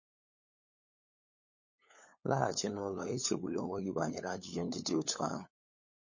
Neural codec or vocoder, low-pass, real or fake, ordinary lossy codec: codec, 16 kHz, 8 kbps, FunCodec, trained on LibriTTS, 25 frames a second; 7.2 kHz; fake; MP3, 32 kbps